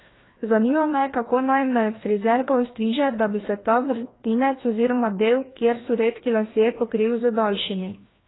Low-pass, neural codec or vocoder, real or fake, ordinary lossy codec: 7.2 kHz; codec, 16 kHz, 1 kbps, FreqCodec, larger model; fake; AAC, 16 kbps